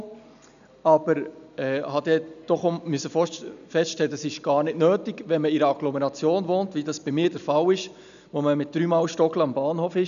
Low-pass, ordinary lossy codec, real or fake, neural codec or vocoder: 7.2 kHz; none; real; none